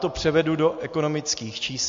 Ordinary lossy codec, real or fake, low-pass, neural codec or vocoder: MP3, 48 kbps; real; 7.2 kHz; none